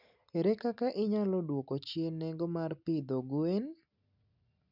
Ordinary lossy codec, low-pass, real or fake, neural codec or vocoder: none; 5.4 kHz; real; none